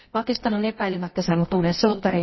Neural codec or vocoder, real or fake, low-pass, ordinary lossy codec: codec, 24 kHz, 0.9 kbps, WavTokenizer, medium music audio release; fake; 7.2 kHz; MP3, 24 kbps